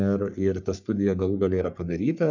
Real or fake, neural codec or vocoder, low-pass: fake; codec, 44.1 kHz, 3.4 kbps, Pupu-Codec; 7.2 kHz